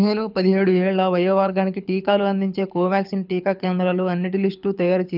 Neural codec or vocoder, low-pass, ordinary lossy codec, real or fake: codec, 24 kHz, 6 kbps, HILCodec; 5.4 kHz; none; fake